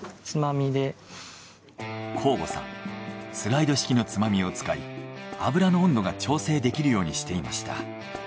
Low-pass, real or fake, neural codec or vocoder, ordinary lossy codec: none; real; none; none